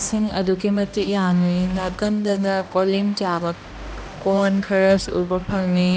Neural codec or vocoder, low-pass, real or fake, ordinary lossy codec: codec, 16 kHz, 1 kbps, X-Codec, HuBERT features, trained on balanced general audio; none; fake; none